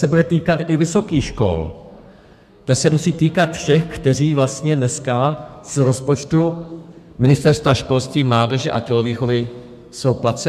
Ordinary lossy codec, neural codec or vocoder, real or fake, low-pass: MP3, 96 kbps; codec, 32 kHz, 1.9 kbps, SNAC; fake; 14.4 kHz